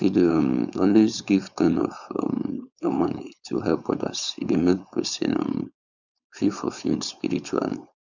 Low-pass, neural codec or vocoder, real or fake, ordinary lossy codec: 7.2 kHz; codec, 16 kHz, 4.8 kbps, FACodec; fake; none